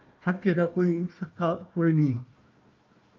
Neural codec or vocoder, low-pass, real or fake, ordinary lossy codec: codec, 16 kHz, 1 kbps, FunCodec, trained on Chinese and English, 50 frames a second; 7.2 kHz; fake; Opus, 24 kbps